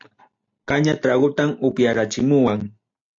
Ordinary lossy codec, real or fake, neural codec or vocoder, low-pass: AAC, 48 kbps; real; none; 7.2 kHz